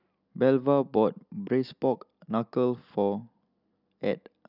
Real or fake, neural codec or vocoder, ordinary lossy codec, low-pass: real; none; none; 5.4 kHz